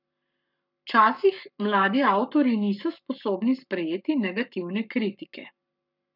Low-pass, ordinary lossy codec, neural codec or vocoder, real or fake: 5.4 kHz; none; codec, 44.1 kHz, 7.8 kbps, Pupu-Codec; fake